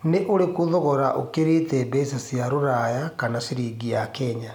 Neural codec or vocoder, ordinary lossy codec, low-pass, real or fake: none; MP3, 96 kbps; 19.8 kHz; real